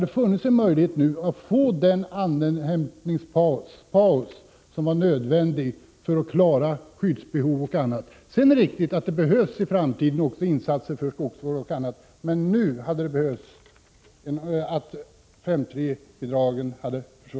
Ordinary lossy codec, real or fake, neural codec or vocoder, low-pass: none; real; none; none